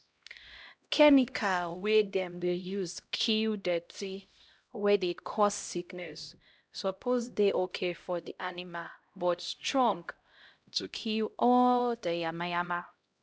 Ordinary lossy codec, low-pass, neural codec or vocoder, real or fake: none; none; codec, 16 kHz, 0.5 kbps, X-Codec, HuBERT features, trained on LibriSpeech; fake